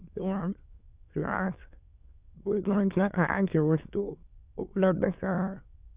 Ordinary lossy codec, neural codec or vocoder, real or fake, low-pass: Opus, 64 kbps; autoencoder, 22.05 kHz, a latent of 192 numbers a frame, VITS, trained on many speakers; fake; 3.6 kHz